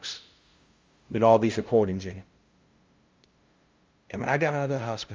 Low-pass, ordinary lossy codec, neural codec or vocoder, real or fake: 7.2 kHz; Opus, 32 kbps; codec, 16 kHz, 0.5 kbps, FunCodec, trained on LibriTTS, 25 frames a second; fake